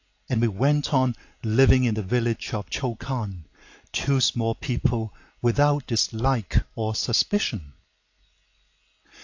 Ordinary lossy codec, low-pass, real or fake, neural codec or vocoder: AAC, 48 kbps; 7.2 kHz; real; none